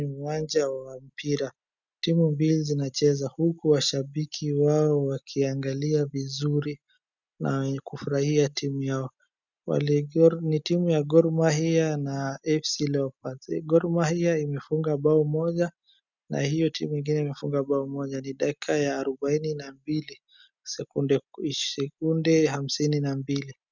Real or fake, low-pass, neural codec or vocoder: real; 7.2 kHz; none